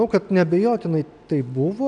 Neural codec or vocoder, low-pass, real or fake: none; 9.9 kHz; real